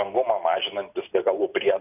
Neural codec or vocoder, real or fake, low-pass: none; real; 3.6 kHz